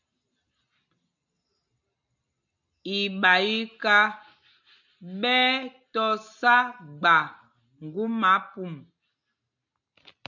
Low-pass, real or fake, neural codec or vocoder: 7.2 kHz; real; none